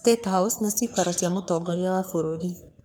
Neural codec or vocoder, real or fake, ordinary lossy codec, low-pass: codec, 44.1 kHz, 3.4 kbps, Pupu-Codec; fake; none; none